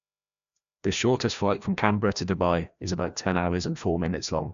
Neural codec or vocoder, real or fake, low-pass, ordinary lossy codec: codec, 16 kHz, 1 kbps, FreqCodec, larger model; fake; 7.2 kHz; AAC, 96 kbps